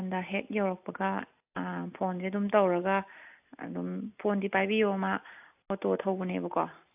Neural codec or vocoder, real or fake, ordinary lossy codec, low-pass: none; real; AAC, 32 kbps; 3.6 kHz